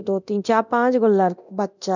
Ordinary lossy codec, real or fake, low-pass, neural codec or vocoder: none; fake; 7.2 kHz; codec, 24 kHz, 0.9 kbps, DualCodec